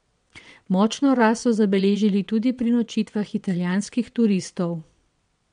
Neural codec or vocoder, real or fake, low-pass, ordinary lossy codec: vocoder, 22.05 kHz, 80 mel bands, WaveNeXt; fake; 9.9 kHz; MP3, 64 kbps